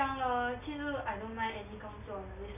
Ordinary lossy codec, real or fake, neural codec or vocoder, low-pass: none; real; none; 3.6 kHz